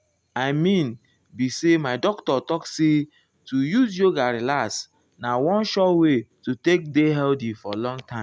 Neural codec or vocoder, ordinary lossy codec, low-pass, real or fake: none; none; none; real